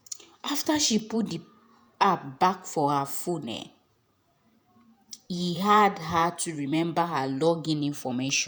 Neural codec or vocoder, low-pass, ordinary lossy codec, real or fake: vocoder, 48 kHz, 128 mel bands, Vocos; none; none; fake